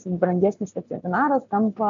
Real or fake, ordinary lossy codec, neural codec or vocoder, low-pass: real; AAC, 64 kbps; none; 7.2 kHz